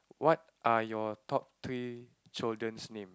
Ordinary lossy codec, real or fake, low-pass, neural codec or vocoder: none; real; none; none